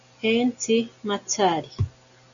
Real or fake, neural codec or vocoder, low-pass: real; none; 7.2 kHz